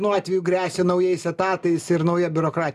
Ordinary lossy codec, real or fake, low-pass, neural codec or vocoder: MP3, 96 kbps; real; 14.4 kHz; none